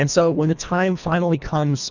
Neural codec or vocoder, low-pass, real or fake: codec, 24 kHz, 1.5 kbps, HILCodec; 7.2 kHz; fake